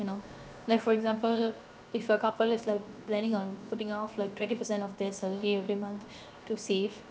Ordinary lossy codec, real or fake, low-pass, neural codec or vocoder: none; fake; none; codec, 16 kHz, 0.7 kbps, FocalCodec